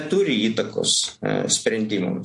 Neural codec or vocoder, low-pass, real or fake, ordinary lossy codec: none; 10.8 kHz; real; MP3, 48 kbps